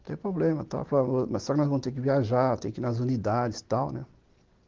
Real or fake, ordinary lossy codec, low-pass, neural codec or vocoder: real; Opus, 16 kbps; 7.2 kHz; none